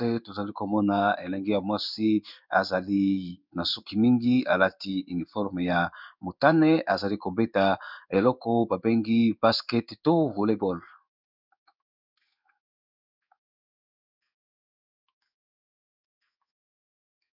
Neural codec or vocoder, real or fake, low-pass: codec, 16 kHz in and 24 kHz out, 1 kbps, XY-Tokenizer; fake; 5.4 kHz